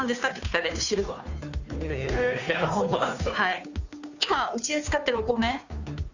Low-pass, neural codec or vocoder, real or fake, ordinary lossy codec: 7.2 kHz; codec, 16 kHz, 2 kbps, FunCodec, trained on Chinese and English, 25 frames a second; fake; none